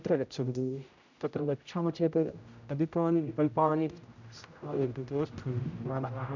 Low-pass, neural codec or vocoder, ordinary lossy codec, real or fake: 7.2 kHz; codec, 16 kHz, 0.5 kbps, X-Codec, HuBERT features, trained on general audio; none; fake